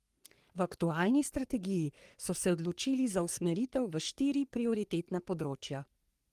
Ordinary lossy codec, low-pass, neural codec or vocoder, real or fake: Opus, 24 kbps; 14.4 kHz; codec, 44.1 kHz, 3.4 kbps, Pupu-Codec; fake